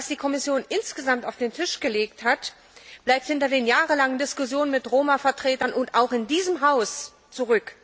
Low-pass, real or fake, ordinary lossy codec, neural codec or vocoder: none; real; none; none